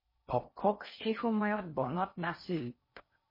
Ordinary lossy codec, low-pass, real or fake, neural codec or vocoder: MP3, 24 kbps; 5.4 kHz; fake; codec, 16 kHz in and 24 kHz out, 0.6 kbps, FocalCodec, streaming, 2048 codes